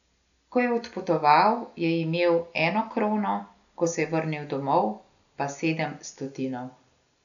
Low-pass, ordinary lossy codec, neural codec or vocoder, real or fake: 7.2 kHz; none; none; real